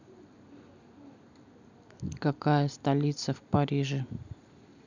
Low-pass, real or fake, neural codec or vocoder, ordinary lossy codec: 7.2 kHz; real; none; none